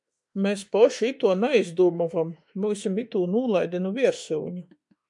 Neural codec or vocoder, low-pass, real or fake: autoencoder, 48 kHz, 32 numbers a frame, DAC-VAE, trained on Japanese speech; 10.8 kHz; fake